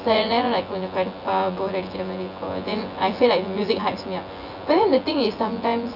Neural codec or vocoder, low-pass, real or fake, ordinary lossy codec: vocoder, 24 kHz, 100 mel bands, Vocos; 5.4 kHz; fake; none